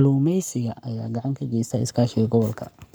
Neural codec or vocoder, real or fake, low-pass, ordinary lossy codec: codec, 44.1 kHz, 7.8 kbps, Pupu-Codec; fake; none; none